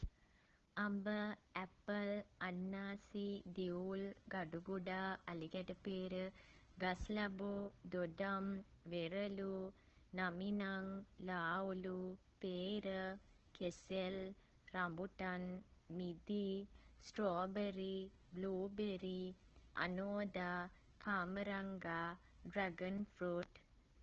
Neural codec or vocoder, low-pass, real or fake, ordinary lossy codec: codec, 16 kHz, 4 kbps, FunCodec, trained on Chinese and English, 50 frames a second; 7.2 kHz; fake; Opus, 16 kbps